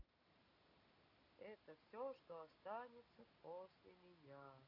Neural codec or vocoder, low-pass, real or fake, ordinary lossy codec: none; 5.4 kHz; real; none